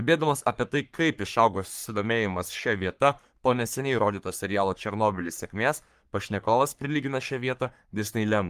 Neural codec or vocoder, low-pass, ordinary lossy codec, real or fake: codec, 44.1 kHz, 3.4 kbps, Pupu-Codec; 14.4 kHz; Opus, 32 kbps; fake